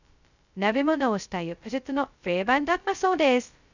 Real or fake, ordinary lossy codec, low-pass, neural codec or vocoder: fake; none; 7.2 kHz; codec, 16 kHz, 0.2 kbps, FocalCodec